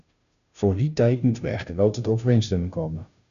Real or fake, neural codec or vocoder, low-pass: fake; codec, 16 kHz, 0.5 kbps, FunCodec, trained on Chinese and English, 25 frames a second; 7.2 kHz